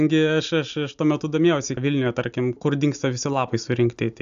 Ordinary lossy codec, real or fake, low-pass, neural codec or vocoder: AAC, 96 kbps; real; 7.2 kHz; none